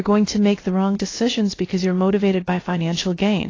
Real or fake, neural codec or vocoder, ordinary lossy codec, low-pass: fake; codec, 16 kHz, 0.3 kbps, FocalCodec; AAC, 32 kbps; 7.2 kHz